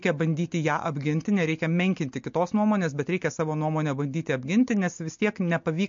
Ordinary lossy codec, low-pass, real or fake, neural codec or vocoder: MP3, 48 kbps; 7.2 kHz; real; none